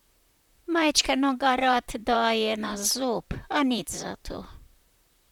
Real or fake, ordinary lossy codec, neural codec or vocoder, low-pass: fake; none; vocoder, 44.1 kHz, 128 mel bands, Pupu-Vocoder; 19.8 kHz